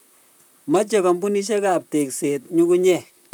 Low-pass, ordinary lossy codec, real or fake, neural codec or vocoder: none; none; fake; vocoder, 44.1 kHz, 128 mel bands, Pupu-Vocoder